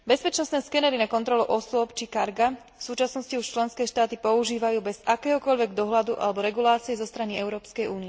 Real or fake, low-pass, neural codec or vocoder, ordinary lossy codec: real; none; none; none